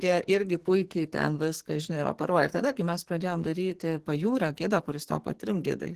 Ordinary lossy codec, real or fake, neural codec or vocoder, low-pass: Opus, 16 kbps; fake; codec, 44.1 kHz, 2.6 kbps, SNAC; 14.4 kHz